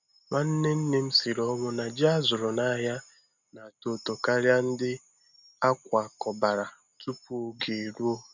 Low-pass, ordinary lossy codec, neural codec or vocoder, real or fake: 7.2 kHz; none; none; real